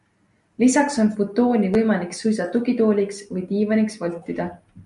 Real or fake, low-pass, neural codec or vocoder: real; 10.8 kHz; none